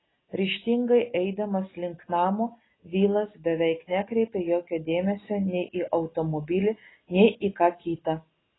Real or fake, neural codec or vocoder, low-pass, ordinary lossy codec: real; none; 7.2 kHz; AAC, 16 kbps